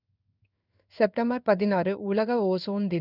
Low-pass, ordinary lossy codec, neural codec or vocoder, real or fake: 5.4 kHz; none; codec, 16 kHz in and 24 kHz out, 1 kbps, XY-Tokenizer; fake